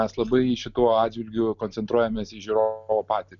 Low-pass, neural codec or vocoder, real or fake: 7.2 kHz; none; real